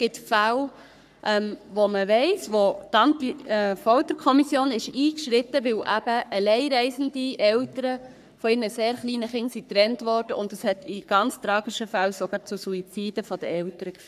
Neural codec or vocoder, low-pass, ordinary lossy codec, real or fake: codec, 44.1 kHz, 3.4 kbps, Pupu-Codec; 14.4 kHz; none; fake